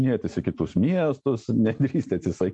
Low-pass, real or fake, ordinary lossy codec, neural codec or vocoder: 10.8 kHz; real; MP3, 48 kbps; none